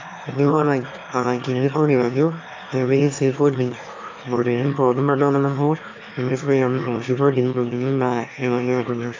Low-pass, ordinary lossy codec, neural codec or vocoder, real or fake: 7.2 kHz; MP3, 64 kbps; autoencoder, 22.05 kHz, a latent of 192 numbers a frame, VITS, trained on one speaker; fake